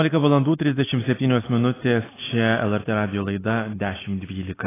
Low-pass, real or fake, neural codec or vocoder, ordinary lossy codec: 3.6 kHz; real; none; AAC, 16 kbps